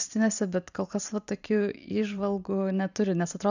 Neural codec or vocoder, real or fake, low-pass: vocoder, 44.1 kHz, 128 mel bands every 512 samples, BigVGAN v2; fake; 7.2 kHz